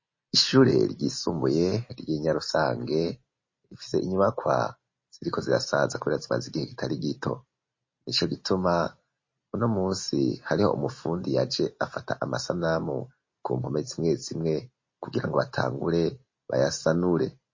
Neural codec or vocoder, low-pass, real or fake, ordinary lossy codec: none; 7.2 kHz; real; MP3, 32 kbps